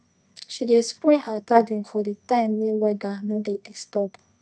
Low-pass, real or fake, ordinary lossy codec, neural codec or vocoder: none; fake; none; codec, 24 kHz, 0.9 kbps, WavTokenizer, medium music audio release